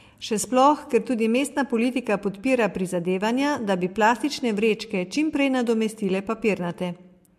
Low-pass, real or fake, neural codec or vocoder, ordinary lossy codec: 14.4 kHz; real; none; MP3, 64 kbps